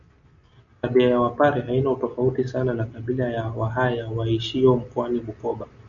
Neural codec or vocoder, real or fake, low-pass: none; real; 7.2 kHz